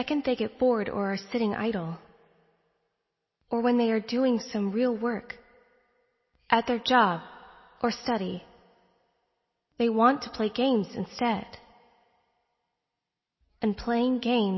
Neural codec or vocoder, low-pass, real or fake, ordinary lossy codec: none; 7.2 kHz; real; MP3, 24 kbps